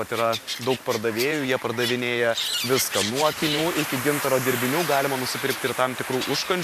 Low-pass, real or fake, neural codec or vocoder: 14.4 kHz; fake; vocoder, 44.1 kHz, 128 mel bands every 512 samples, BigVGAN v2